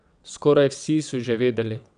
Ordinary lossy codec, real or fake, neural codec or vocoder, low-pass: none; fake; vocoder, 22.05 kHz, 80 mel bands, WaveNeXt; 9.9 kHz